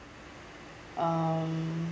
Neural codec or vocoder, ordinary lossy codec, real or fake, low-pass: none; none; real; none